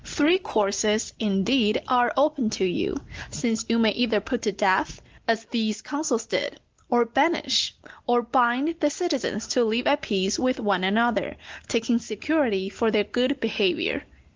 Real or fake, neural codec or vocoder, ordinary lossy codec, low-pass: real; none; Opus, 16 kbps; 7.2 kHz